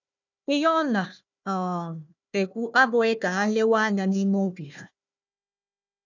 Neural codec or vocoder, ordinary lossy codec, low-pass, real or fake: codec, 16 kHz, 1 kbps, FunCodec, trained on Chinese and English, 50 frames a second; none; 7.2 kHz; fake